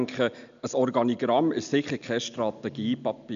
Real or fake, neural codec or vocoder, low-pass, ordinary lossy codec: real; none; 7.2 kHz; MP3, 64 kbps